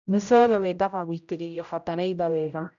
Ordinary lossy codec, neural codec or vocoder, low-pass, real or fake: none; codec, 16 kHz, 0.5 kbps, X-Codec, HuBERT features, trained on general audio; 7.2 kHz; fake